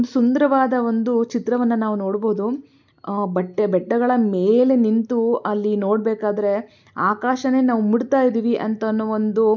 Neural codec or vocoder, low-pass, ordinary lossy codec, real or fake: none; 7.2 kHz; none; real